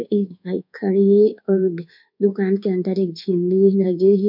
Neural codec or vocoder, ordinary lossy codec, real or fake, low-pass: codec, 24 kHz, 1.2 kbps, DualCodec; none; fake; 5.4 kHz